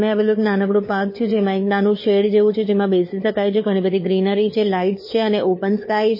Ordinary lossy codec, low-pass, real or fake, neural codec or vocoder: MP3, 24 kbps; 5.4 kHz; fake; codec, 16 kHz, 4 kbps, FunCodec, trained on LibriTTS, 50 frames a second